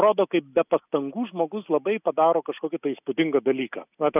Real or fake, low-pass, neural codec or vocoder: real; 3.6 kHz; none